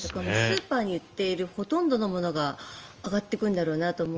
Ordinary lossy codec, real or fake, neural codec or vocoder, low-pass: Opus, 24 kbps; real; none; 7.2 kHz